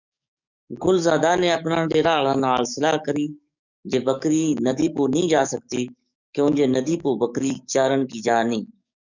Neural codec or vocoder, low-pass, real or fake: codec, 44.1 kHz, 7.8 kbps, DAC; 7.2 kHz; fake